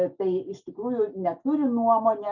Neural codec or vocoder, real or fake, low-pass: none; real; 7.2 kHz